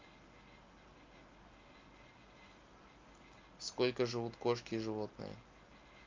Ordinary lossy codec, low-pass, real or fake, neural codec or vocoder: Opus, 24 kbps; 7.2 kHz; real; none